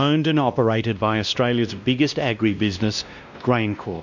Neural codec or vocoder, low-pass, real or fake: codec, 16 kHz, 1 kbps, X-Codec, WavLM features, trained on Multilingual LibriSpeech; 7.2 kHz; fake